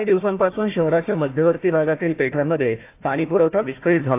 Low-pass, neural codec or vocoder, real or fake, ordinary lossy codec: 3.6 kHz; codec, 16 kHz, 1 kbps, FunCodec, trained on Chinese and English, 50 frames a second; fake; AAC, 24 kbps